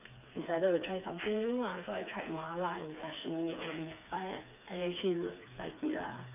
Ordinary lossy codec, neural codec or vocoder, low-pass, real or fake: none; codec, 16 kHz, 4 kbps, FreqCodec, smaller model; 3.6 kHz; fake